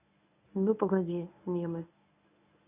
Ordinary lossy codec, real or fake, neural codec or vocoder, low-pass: none; fake; codec, 24 kHz, 0.9 kbps, WavTokenizer, medium speech release version 1; 3.6 kHz